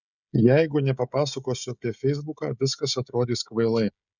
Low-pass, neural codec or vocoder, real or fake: 7.2 kHz; codec, 16 kHz, 16 kbps, FreqCodec, smaller model; fake